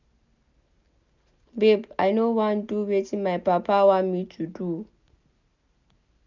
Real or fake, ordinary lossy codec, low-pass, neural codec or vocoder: real; none; 7.2 kHz; none